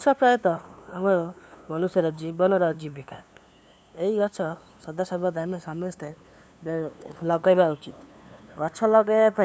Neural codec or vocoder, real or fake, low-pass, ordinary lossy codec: codec, 16 kHz, 2 kbps, FunCodec, trained on LibriTTS, 25 frames a second; fake; none; none